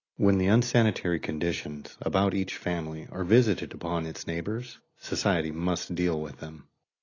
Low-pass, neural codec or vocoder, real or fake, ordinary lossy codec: 7.2 kHz; none; real; AAC, 32 kbps